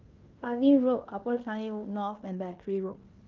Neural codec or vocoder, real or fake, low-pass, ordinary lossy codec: codec, 16 kHz in and 24 kHz out, 0.9 kbps, LongCat-Audio-Codec, fine tuned four codebook decoder; fake; 7.2 kHz; Opus, 32 kbps